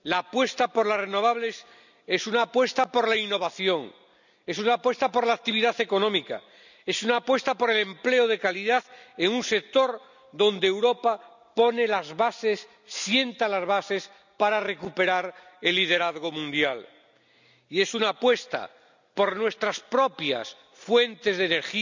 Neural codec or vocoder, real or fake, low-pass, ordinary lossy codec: none; real; 7.2 kHz; none